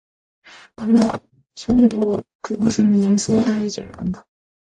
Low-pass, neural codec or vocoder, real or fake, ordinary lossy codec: 10.8 kHz; codec, 44.1 kHz, 0.9 kbps, DAC; fake; AAC, 64 kbps